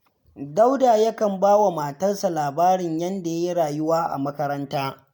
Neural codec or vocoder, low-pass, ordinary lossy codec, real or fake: none; none; none; real